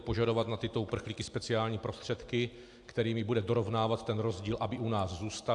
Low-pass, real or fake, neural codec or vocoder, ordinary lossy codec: 10.8 kHz; real; none; AAC, 64 kbps